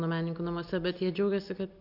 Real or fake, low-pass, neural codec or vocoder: real; 5.4 kHz; none